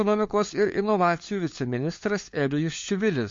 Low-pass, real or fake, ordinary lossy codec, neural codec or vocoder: 7.2 kHz; fake; MP3, 48 kbps; codec, 16 kHz, 4 kbps, FunCodec, trained on LibriTTS, 50 frames a second